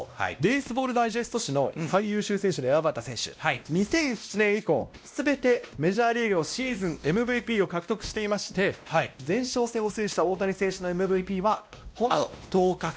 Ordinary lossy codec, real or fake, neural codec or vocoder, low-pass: none; fake; codec, 16 kHz, 1 kbps, X-Codec, WavLM features, trained on Multilingual LibriSpeech; none